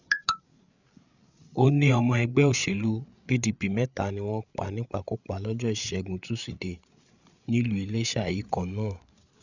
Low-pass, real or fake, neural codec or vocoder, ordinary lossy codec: 7.2 kHz; fake; codec, 16 kHz, 8 kbps, FreqCodec, larger model; none